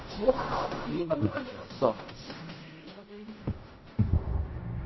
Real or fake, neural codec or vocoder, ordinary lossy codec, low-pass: fake; codec, 16 kHz in and 24 kHz out, 0.4 kbps, LongCat-Audio-Codec, fine tuned four codebook decoder; MP3, 24 kbps; 7.2 kHz